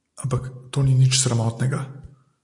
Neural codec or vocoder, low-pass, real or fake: none; 10.8 kHz; real